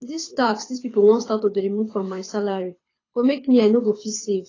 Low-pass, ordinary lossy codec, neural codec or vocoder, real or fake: 7.2 kHz; AAC, 32 kbps; codec, 24 kHz, 6 kbps, HILCodec; fake